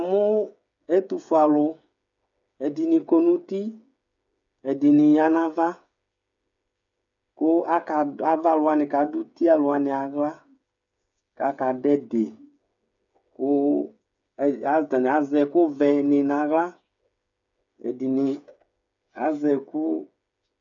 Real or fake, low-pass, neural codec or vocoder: fake; 7.2 kHz; codec, 16 kHz, 8 kbps, FreqCodec, smaller model